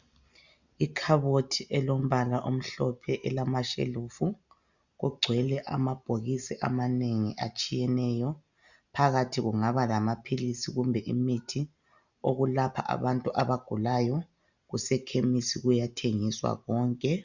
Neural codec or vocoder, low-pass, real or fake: none; 7.2 kHz; real